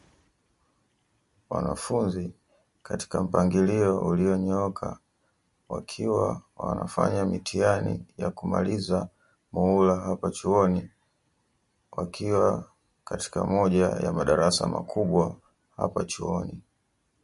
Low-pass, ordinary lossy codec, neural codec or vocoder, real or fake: 14.4 kHz; MP3, 48 kbps; none; real